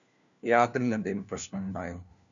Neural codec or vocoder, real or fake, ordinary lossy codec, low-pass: codec, 16 kHz, 1 kbps, FunCodec, trained on LibriTTS, 50 frames a second; fake; MP3, 96 kbps; 7.2 kHz